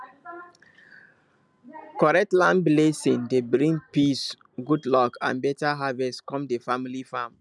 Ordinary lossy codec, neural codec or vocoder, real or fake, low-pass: none; none; real; none